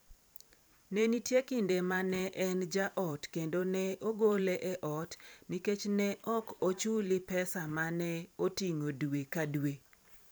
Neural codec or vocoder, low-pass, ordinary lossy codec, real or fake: vocoder, 44.1 kHz, 128 mel bands every 512 samples, BigVGAN v2; none; none; fake